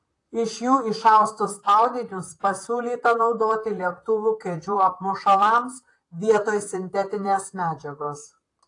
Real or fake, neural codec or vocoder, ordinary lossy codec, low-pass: fake; vocoder, 44.1 kHz, 128 mel bands, Pupu-Vocoder; AAC, 48 kbps; 10.8 kHz